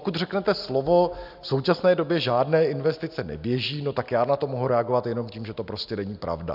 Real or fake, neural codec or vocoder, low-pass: real; none; 5.4 kHz